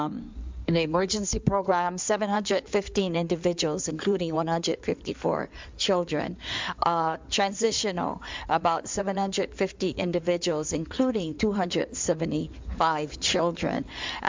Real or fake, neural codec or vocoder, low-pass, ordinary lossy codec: fake; codec, 16 kHz in and 24 kHz out, 2.2 kbps, FireRedTTS-2 codec; 7.2 kHz; MP3, 64 kbps